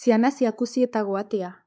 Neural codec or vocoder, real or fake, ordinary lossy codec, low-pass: codec, 16 kHz, 4 kbps, X-Codec, WavLM features, trained on Multilingual LibriSpeech; fake; none; none